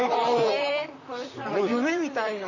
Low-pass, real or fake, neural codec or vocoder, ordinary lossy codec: 7.2 kHz; fake; vocoder, 44.1 kHz, 128 mel bands, Pupu-Vocoder; none